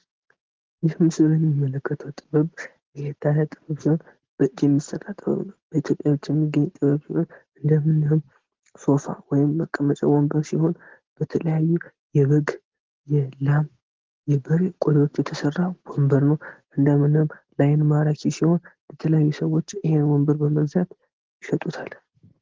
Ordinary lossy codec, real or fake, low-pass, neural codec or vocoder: Opus, 16 kbps; real; 7.2 kHz; none